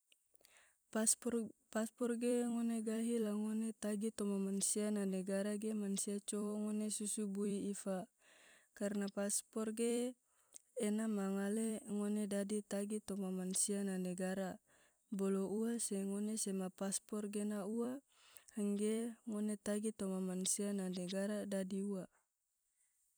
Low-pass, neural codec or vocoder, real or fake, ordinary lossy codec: none; vocoder, 44.1 kHz, 128 mel bands every 256 samples, BigVGAN v2; fake; none